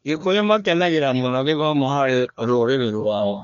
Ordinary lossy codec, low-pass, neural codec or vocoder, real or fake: none; 7.2 kHz; codec, 16 kHz, 1 kbps, FreqCodec, larger model; fake